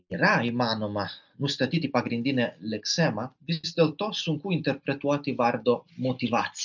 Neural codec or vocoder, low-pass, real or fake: none; 7.2 kHz; real